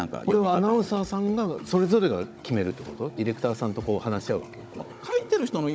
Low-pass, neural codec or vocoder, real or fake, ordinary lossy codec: none; codec, 16 kHz, 16 kbps, FunCodec, trained on Chinese and English, 50 frames a second; fake; none